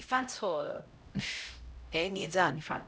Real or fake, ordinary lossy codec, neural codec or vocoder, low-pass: fake; none; codec, 16 kHz, 0.5 kbps, X-Codec, HuBERT features, trained on LibriSpeech; none